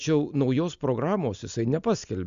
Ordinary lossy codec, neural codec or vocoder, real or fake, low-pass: Opus, 64 kbps; none; real; 7.2 kHz